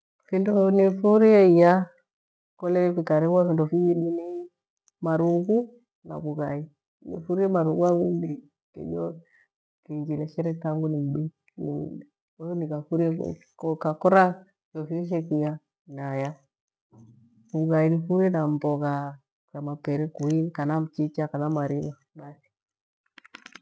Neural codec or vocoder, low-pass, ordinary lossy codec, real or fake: none; none; none; real